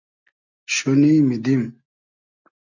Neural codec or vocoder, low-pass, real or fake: none; 7.2 kHz; real